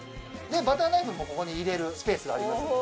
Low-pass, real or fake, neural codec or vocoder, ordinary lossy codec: none; real; none; none